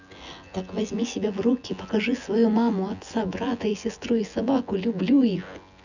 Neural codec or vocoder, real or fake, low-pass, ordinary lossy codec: vocoder, 24 kHz, 100 mel bands, Vocos; fake; 7.2 kHz; none